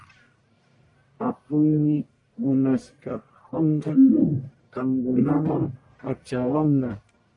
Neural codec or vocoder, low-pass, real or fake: codec, 44.1 kHz, 1.7 kbps, Pupu-Codec; 10.8 kHz; fake